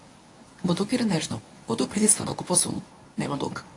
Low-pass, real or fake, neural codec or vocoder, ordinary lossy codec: 10.8 kHz; fake; codec, 24 kHz, 0.9 kbps, WavTokenizer, medium speech release version 1; AAC, 48 kbps